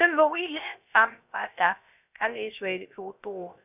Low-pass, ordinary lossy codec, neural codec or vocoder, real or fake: 3.6 kHz; none; codec, 16 kHz, 0.3 kbps, FocalCodec; fake